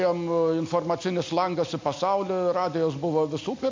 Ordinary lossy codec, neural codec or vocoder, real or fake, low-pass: MP3, 48 kbps; none; real; 7.2 kHz